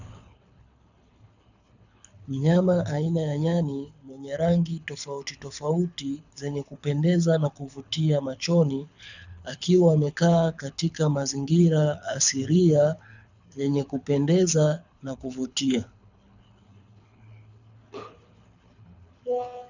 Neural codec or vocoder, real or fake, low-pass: codec, 24 kHz, 6 kbps, HILCodec; fake; 7.2 kHz